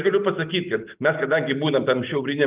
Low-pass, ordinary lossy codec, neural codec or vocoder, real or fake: 3.6 kHz; Opus, 32 kbps; none; real